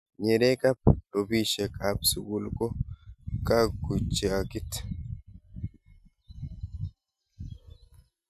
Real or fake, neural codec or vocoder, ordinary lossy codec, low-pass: real; none; none; 14.4 kHz